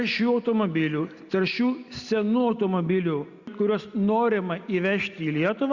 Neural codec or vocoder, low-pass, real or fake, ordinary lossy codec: none; 7.2 kHz; real; Opus, 64 kbps